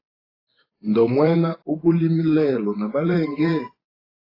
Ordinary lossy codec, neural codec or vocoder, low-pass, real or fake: AAC, 24 kbps; vocoder, 44.1 kHz, 128 mel bands every 512 samples, BigVGAN v2; 5.4 kHz; fake